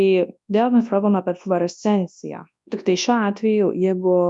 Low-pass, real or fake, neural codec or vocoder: 10.8 kHz; fake; codec, 24 kHz, 0.9 kbps, WavTokenizer, large speech release